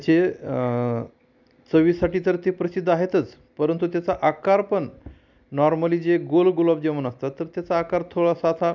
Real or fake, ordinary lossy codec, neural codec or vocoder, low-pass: real; none; none; 7.2 kHz